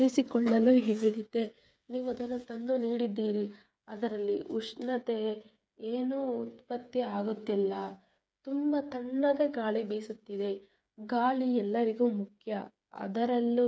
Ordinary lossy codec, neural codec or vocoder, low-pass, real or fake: none; codec, 16 kHz, 8 kbps, FreqCodec, smaller model; none; fake